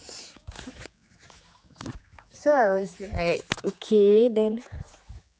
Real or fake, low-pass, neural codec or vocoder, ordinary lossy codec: fake; none; codec, 16 kHz, 4 kbps, X-Codec, HuBERT features, trained on general audio; none